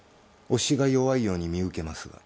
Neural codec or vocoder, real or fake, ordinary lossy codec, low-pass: none; real; none; none